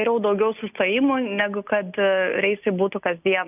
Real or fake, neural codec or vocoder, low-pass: real; none; 3.6 kHz